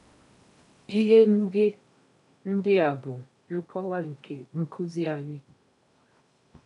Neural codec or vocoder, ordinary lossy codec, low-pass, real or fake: codec, 16 kHz in and 24 kHz out, 0.8 kbps, FocalCodec, streaming, 65536 codes; none; 10.8 kHz; fake